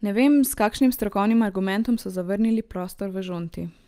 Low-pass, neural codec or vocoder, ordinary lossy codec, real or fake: 14.4 kHz; none; Opus, 32 kbps; real